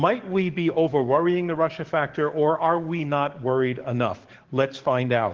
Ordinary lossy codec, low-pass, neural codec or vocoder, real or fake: Opus, 16 kbps; 7.2 kHz; codec, 44.1 kHz, 7.8 kbps, DAC; fake